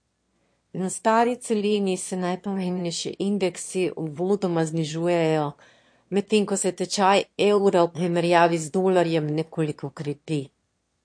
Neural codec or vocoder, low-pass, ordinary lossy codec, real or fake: autoencoder, 22.05 kHz, a latent of 192 numbers a frame, VITS, trained on one speaker; 9.9 kHz; MP3, 48 kbps; fake